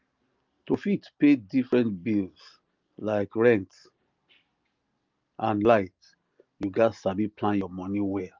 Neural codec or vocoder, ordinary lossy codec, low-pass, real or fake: none; Opus, 24 kbps; 7.2 kHz; real